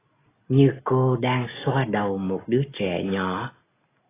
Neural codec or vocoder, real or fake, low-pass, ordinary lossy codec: none; real; 3.6 kHz; AAC, 16 kbps